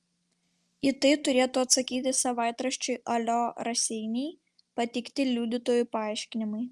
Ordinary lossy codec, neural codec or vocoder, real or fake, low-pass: Opus, 32 kbps; none; real; 10.8 kHz